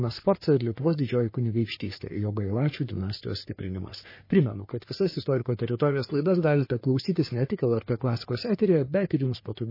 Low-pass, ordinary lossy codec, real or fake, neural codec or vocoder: 5.4 kHz; MP3, 24 kbps; fake; codec, 44.1 kHz, 3.4 kbps, Pupu-Codec